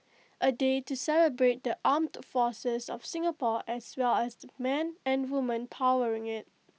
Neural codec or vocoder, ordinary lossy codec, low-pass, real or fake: none; none; none; real